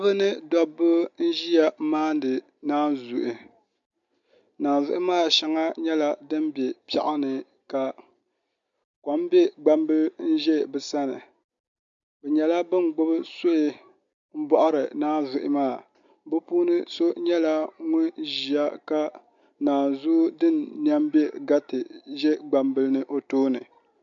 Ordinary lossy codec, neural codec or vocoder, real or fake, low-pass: MP3, 64 kbps; none; real; 7.2 kHz